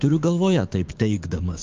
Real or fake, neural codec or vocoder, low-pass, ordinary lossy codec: fake; codec, 16 kHz, 6 kbps, DAC; 7.2 kHz; Opus, 24 kbps